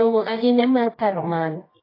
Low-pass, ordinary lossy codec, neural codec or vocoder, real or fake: 5.4 kHz; AAC, 32 kbps; codec, 24 kHz, 0.9 kbps, WavTokenizer, medium music audio release; fake